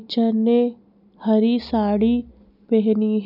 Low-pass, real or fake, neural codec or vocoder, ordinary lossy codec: 5.4 kHz; real; none; none